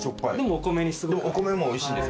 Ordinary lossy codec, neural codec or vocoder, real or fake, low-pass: none; none; real; none